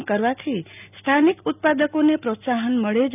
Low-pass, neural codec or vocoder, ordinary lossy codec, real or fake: 3.6 kHz; none; none; real